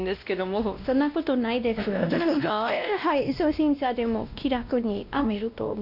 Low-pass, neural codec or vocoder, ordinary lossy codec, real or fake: 5.4 kHz; codec, 16 kHz, 1 kbps, X-Codec, WavLM features, trained on Multilingual LibriSpeech; none; fake